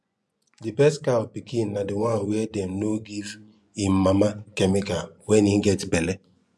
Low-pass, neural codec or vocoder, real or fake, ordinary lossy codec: none; vocoder, 24 kHz, 100 mel bands, Vocos; fake; none